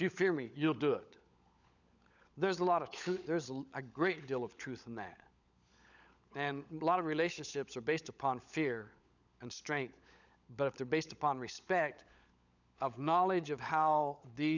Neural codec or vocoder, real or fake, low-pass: codec, 16 kHz, 16 kbps, FunCodec, trained on LibriTTS, 50 frames a second; fake; 7.2 kHz